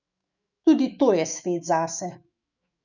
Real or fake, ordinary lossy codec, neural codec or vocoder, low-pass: fake; none; autoencoder, 48 kHz, 128 numbers a frame, DAC-VAE, trained on Japanese speech; 7.2 kHz